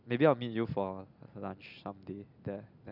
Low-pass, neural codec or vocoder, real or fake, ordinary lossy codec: 5.4 kHz; none; real; none